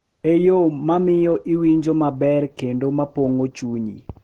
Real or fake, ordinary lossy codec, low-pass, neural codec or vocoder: real; Opus, 16 kbps; 19.8 kHz; none